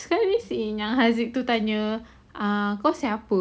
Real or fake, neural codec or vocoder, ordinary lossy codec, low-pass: real; none; none; none